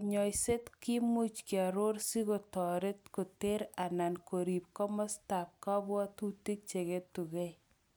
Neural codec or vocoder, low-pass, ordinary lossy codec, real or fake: none; none; none; real